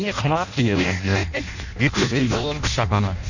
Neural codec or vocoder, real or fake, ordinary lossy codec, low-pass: codec, 16 kHz in and 24 kHz out, 0.6 kbps, FireRedTTS-2 codec; fake; none; 7.2 kHz